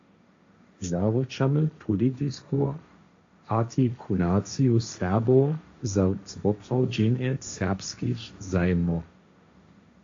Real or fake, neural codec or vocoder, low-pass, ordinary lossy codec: fake; codec, 16 kHz, 1.1 kbps, Voila-Tokenizer; 7.2 kHz; AAC, 48 kbps